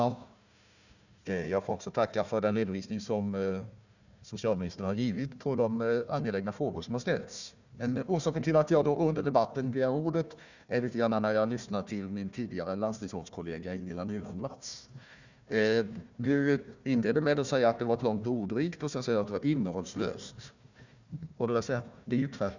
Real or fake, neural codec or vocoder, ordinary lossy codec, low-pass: fake; codec, 16 kHz, 1 kbps, FunCodec, trained on Chinese and English, 50 frames a second; none; 7.2 kHz